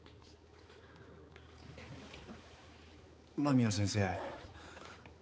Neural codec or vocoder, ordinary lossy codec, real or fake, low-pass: codec, 16 kHz, 4 kbps, X-Codec, HuBERT features, trained on balanced general audio; none; fake; none